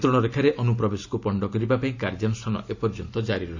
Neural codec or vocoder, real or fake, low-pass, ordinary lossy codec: none; real; 7.2 kHz; AAC, 48 kbps